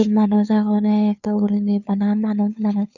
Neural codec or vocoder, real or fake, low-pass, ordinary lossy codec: codec, 16 kHz, 2 kbps, FunCodec, trained on Chinese and English, 25 frames a second; fake; 7.2 kHz; none